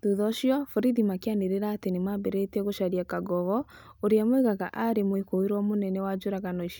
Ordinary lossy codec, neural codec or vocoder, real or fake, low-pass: none; none; real; none